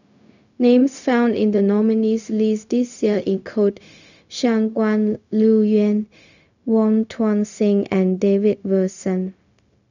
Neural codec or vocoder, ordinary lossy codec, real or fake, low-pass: codec, 16 kHz, 0.4 kbps, LongCat-Audio-Codec; none; fake; 7.2 kHz